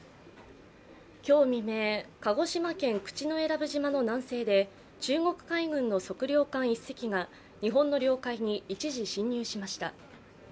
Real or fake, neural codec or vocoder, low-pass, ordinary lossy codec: real; none; none; none